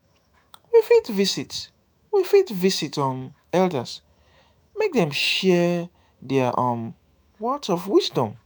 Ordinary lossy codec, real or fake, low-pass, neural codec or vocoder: none; fake; none; autoencoder, 48 kHz, 128 numbers a frame, DAC-VAE, trained on Japanese speech